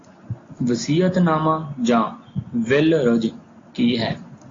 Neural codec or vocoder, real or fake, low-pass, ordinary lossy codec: none; real; 7.2 kHz; AAC, 32 kbps